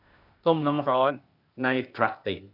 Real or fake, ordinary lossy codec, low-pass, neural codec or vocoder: fake; none; 5.4 kHz; codec, 16 kHz, 0.8 kbps, ZipCodec